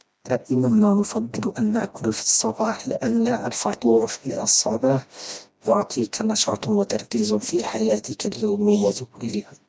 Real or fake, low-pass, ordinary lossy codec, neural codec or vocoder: fake; none; none; codec, 16 kHz, 1 kbps, FreqCodec, smaller model